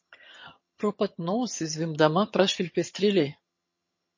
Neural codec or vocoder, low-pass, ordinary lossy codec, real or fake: vocoder, 22.05 kHz, 80 mel bands, HiFi-GAN; 7.2 kHz; MP3, 32 kbps; fake